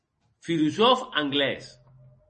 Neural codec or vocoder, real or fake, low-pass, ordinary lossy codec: none; real; 9.9 kHz; MP3, 32 kbps